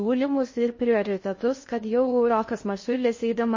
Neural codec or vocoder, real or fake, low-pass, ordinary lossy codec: codec, 16 kHz in and 24 kHz out, 0.6 kbps, FocalCodec, streaming, 2048 codes; fake; 7.2 kHz; MP3, 32 kbps